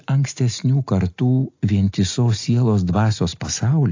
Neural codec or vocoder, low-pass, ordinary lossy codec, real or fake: none; 7.2 kHz; AAC, 48 kbps; real